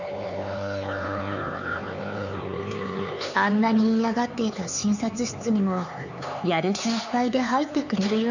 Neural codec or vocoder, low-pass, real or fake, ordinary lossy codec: codec, 16 kHz, 4 kbps, X-Codec, HuBERT features, trained on LibriSpeech; 7.2 kHz; fake; none